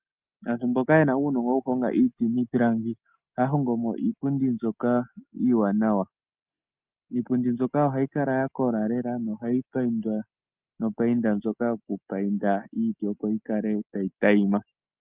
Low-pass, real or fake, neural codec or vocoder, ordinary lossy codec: 3.6 kHz; real; none; Opus, 24 kbps